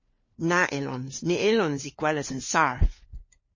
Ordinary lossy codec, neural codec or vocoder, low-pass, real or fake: MP3, 32 kbps; codec, 16 kHz, 4 kbps, FunCodec, trained on LibriTTS, 50 frames a second; 7.2 kHz; fake